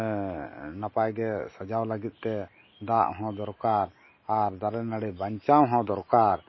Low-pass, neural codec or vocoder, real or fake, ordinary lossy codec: 7.2 kHz; none; real; MP3, 24 kbps